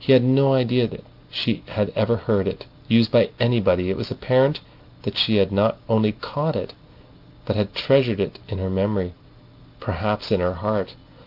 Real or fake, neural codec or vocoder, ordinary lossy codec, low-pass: real; none; Opus, 32 kbps; 5.4 kHz